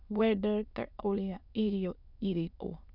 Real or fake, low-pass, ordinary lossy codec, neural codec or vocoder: fake; 5.4 kHz; none; autoencoder, 22.05 kHz, a latent of 192 numbers a frame, VITS, trained on many speakers